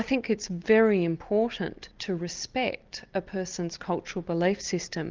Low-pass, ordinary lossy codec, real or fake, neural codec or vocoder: 7.2 kHz; Opus, 24 kbps; real; none